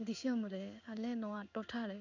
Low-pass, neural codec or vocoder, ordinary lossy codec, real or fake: 7.2 kHz; codec, 16 kHz in and 24 kHz out, 1 kbps, XY-Tokenizer; none; fake